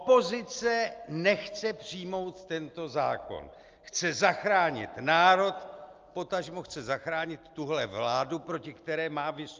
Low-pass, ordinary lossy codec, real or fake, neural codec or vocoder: 7.2 kHz; Opus, 24 kbps; real; none